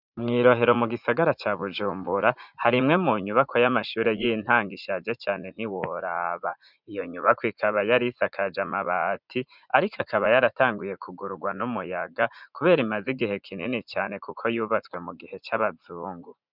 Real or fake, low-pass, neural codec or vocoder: fake; 5.4 kHz; vocoder, 44.1 kHz, 80 mel bands, Vocos